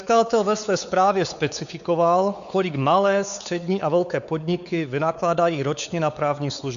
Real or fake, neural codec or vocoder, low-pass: fake; codec, 16 kHz, 4 kbps, X-Codec, WavLM features, trained on Multilingual LibriSpeech; 7.2 kHz